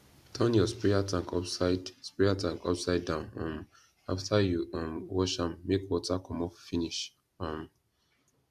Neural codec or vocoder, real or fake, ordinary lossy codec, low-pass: none; real; AAC, 96 kbps; 14.4 kHz